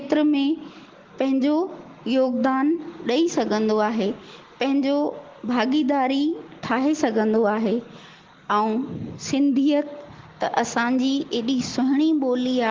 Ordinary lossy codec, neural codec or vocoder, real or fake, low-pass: Opus, 16 kbps; none; real; 7.2 kHz